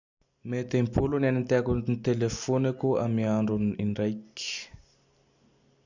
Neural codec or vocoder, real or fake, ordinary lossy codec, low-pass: none; real; none; 7.2 kHz